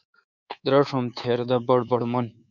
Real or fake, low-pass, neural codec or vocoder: fake; 7.2 kHz; codec, 24 kHz, 3.1 kbps, DualCodec